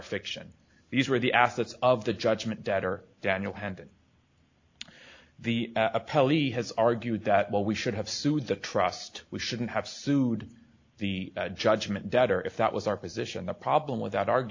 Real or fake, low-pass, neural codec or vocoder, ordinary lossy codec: real; 7.2 kHz; none; AAC, 48 kbps